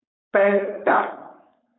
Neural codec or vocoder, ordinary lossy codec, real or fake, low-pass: codec, 16 kHz, 4.8 kbps, FACodec; AAC, 16 kbps; fake; 7.2 kHz